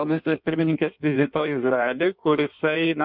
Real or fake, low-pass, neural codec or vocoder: fake; 5.4 kHz; codec, 16 kHz in and 24 kHz out, 1.1 kbps, FireRedTTS-2 codec